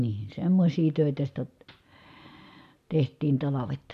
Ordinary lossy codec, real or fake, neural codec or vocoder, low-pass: MP3, 96 kbps; real; none; 14.4 kHz